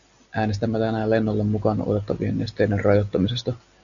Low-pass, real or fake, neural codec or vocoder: 7.2 kHz; real; none